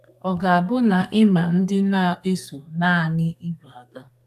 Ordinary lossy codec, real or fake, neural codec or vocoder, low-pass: none; fake; codec, 32 kHz, 1.9 kbps, SNAC; 14.4 kHz